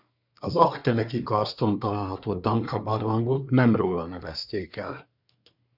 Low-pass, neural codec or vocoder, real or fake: 5.4 kHz; codec, 24 kHz, 1 kbps, SNAC; fake